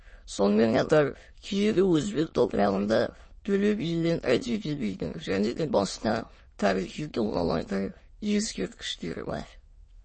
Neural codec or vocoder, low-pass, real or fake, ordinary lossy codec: autoencoder, 22.05 kHz, a latent of 192 numbers a frame, VITS, trained on many speakers; 9.9 kHz; fake; MP3, 32 kbps